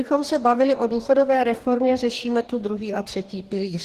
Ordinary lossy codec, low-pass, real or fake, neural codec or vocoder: Opus, 16 kbps; 14.4 kHz; fake; codec, 44.1 kHz, 2.6 kbps, DAC